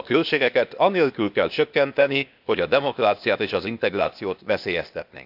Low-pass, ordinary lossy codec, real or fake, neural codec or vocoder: 5.4 kHz; none; fake; codec, 16 kHz, 0.8 kbps, ZipCodec